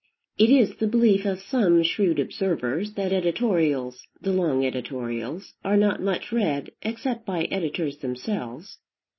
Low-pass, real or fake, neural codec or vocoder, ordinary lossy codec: 7.2 kHz; real; none; MP3, 24 kbps